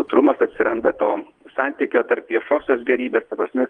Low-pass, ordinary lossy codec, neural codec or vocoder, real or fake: 9.9 kHz; Opus, 32 kbps; vocoder, 22.05 kHz, 80 mel bands, WaveNeXt; fake